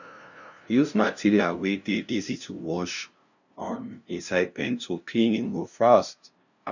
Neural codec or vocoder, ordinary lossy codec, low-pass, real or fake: codec, 16 kHz, 0.5 kbps, FunCodec, trained on LibriTTS, 25 frames a second; none; 7.2 kHz; fake